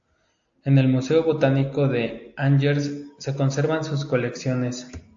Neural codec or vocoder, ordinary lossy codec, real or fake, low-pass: none; AAC, 64 kbps; real; 7.2 kHz